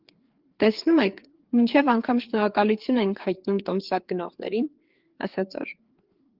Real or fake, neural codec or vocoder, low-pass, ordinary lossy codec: fake; codec, 16 kHz, 4 kbps, FreqCodec, larger model; 5.4 kHz; Opus, 16 kbps